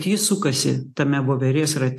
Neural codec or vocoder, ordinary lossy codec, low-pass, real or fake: none; AAC, 64 kbps; 14.4 kHz; real